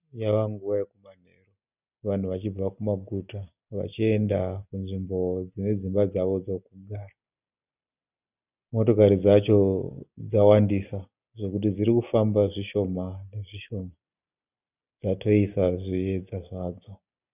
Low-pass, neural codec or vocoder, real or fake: 3.6 kHz; none; real